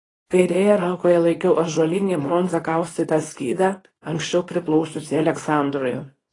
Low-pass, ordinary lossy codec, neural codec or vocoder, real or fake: 10.8 kHz; AAC, 32 kbps; codec, 24 kHz, 0.9 kbps, WavTokenizer, small release; fake